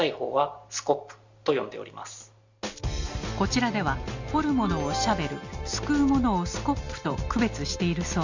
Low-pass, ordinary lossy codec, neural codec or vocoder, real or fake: 7.2 kHz; Opus, 64 kbps; none; real